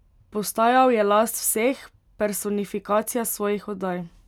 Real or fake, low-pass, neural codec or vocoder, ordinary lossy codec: real; 19.8 kHz; none; none